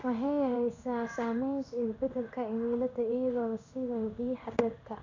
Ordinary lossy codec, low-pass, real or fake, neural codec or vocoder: none; 7.2 kHz; fake; codec, 16 kHz in and 24 kHz out, 1 kbps, XY-Tokenizer